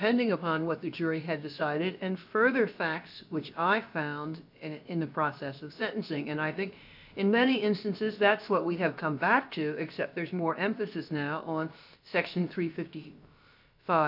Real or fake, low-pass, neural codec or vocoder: fake; 5.4 kHz; codec, 16 kHz, about 1 kbps, DyCAST, with the encoder's durations